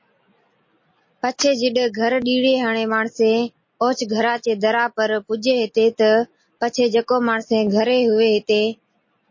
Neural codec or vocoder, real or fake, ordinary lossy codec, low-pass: none; real; MP3, 32 kbps; 7.2 kHz